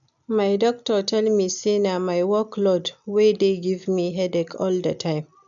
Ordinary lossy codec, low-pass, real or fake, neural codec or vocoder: none; 7.2 kHz; real; none